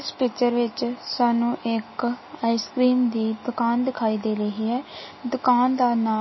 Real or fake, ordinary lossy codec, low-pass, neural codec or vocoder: real; MP3, 24 kbps; 7.2 kHz; none